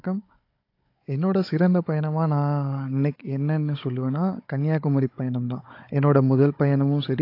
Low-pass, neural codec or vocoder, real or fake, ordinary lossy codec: 5.4 kHz; codec, 16 kHz, 16 kbps, FunCodec, trained on Chinese and English, 50 frames a second; fake; AAC, 32 kbps